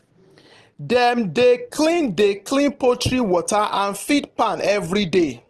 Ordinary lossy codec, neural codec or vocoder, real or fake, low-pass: Opus, 16 kbps; none; real; 10.8 kHz